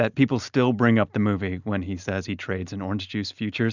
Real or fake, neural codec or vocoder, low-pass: real; none; 7.2 kHz